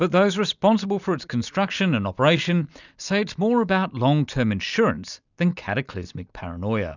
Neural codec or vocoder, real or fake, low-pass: none; real; 7.2 kHz